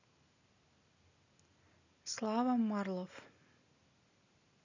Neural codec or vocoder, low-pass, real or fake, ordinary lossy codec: none; 7.2 kHz; real; none